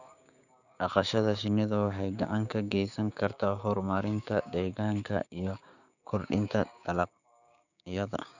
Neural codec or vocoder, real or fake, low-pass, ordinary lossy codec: codec, 16 kHz, 6 kbps, DAC; fake; 7.2 kHz; none